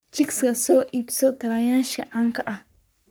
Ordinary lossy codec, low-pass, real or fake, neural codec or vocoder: none; none; fake; codec, 44.1 kHz, 3.4 kbps, Pupu-Codec